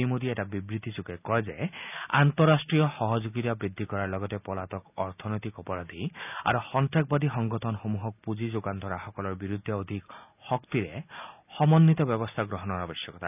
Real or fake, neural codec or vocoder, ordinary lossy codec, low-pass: real; none; none; 3.6 kHz